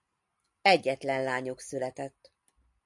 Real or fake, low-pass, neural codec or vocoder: real; 10.8 kHz; none